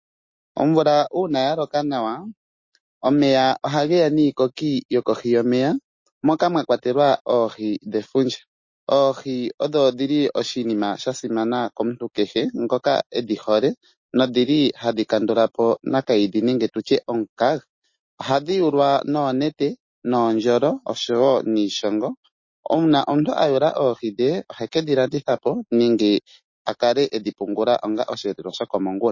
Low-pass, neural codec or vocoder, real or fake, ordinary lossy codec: 7.2 kHz; none; real; MP3, 32 kbps